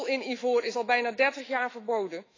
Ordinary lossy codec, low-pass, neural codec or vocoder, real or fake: AAC, 32 kbps; 7.2 kHz; autoencoder, 48 kHz, 128 numbers a frame, DAC-VAE, trained on Japanese speech; fake